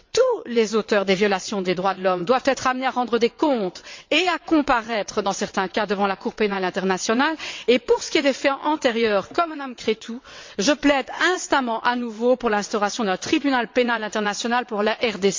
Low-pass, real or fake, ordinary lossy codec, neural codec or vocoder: 7.2 kHz; fake; MP3, 48 kbps; vocoder, 22.05 kHz, 80 mel bands, WaveNeXt